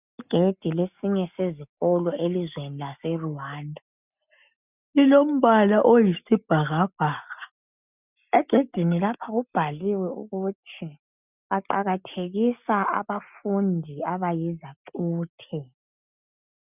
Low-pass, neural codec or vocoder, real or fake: 3.6 kHz; none; real